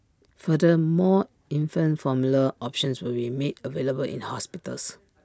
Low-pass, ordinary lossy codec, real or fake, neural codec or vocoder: none; none; real; none